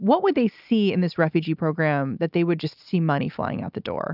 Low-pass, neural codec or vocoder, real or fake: 5.4 kHz; none; real